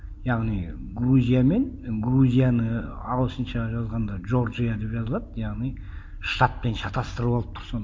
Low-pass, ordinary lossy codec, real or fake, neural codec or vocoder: none; none; real; none